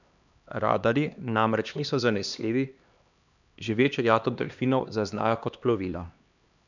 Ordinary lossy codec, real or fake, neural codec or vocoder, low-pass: none; fake; codec, 16 kHz, 2 kbps, X-Codec, HuBERT features, trained on LibriSpeech; 7.2 kHz